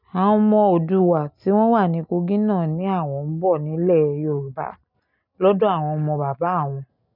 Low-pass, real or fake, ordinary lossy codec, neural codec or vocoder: 5.4 kHz; real; none; none